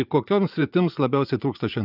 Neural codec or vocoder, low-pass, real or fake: vocoder, 24 kHz, 100 mel bands, Vocos; 5.4 kHz; fake